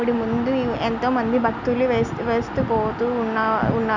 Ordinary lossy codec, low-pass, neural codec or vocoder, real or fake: none; 7.2 kHz; none; real